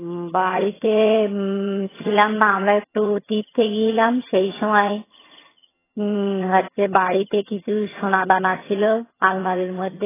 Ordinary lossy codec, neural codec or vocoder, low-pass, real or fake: AAC, 16 kbps; vocoder, 22.05 kHz, 80 mel bands, HiFi-GAN; 3.6 kHz; fake